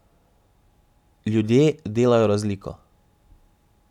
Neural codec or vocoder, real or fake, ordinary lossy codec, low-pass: none; real; none; 19.8 kHz